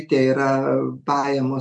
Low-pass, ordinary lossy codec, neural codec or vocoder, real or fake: 10.8 kHz; MP3, 64 kbps; none; real